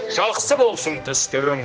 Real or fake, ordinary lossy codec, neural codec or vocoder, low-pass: fake; none; codec, 16 kHz, 1 kbps, X-Codec, HuBERT features, trained on general audio; none